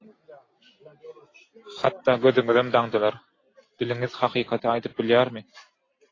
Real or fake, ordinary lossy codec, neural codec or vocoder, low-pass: real; AAC, 32 kbps; none; 7.2 kHz